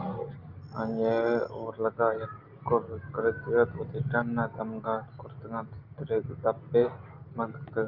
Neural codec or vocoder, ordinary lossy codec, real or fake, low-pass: none; Opus, 24 kbps; real; 5.4 kHz